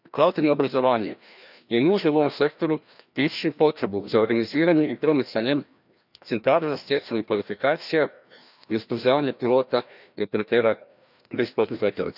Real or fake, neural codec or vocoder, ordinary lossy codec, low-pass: fake; codec, 16 kHz, 1 kbps, FreqCodec, larger model; none; 5.4 kHz